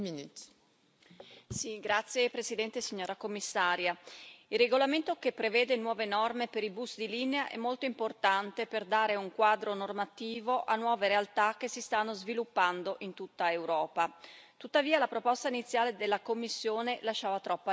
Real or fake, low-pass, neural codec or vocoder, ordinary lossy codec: real; none; none; none